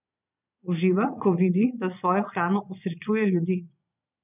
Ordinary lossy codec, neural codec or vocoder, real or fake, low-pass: none; vocoder, 22.05 kHz, 80 mel bands, Vocos; fake; 3.6 kHz